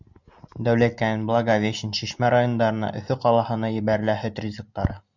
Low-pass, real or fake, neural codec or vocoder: 7.2 kHz; real; none